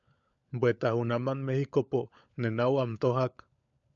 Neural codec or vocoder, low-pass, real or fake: codec, 16 kHz, 16 kbps, FunCodec, trained on LibriTTS, 50 frames a second; 7.2 kHz; fake